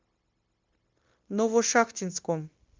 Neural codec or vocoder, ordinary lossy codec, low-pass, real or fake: codec, 16 kHz, 0.9 kbps, LongCat-Audio-Codec; Opus, 32 kbps; 7.2 kHz; fake